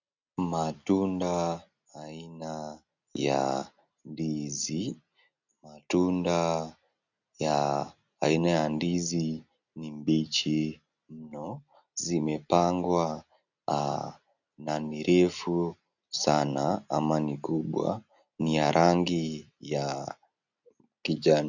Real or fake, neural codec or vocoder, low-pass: real; none; 7.2 kHz